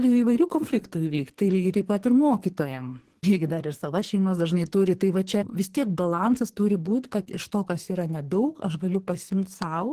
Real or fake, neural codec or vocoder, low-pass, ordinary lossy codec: fake; codec, 44.1 kHz, 2.6 kbps, SNAC; 14.4 kHz; Opus, 16 kbps